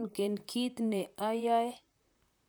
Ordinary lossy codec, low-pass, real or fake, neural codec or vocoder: none; none; fake; vocoder, 44.1 kHz, 128 mel bands, Pupu-Vocoder